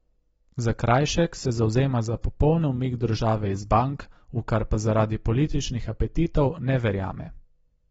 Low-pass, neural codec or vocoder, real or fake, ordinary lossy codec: 14.4 kHz; none; real; AAC, 24 kbps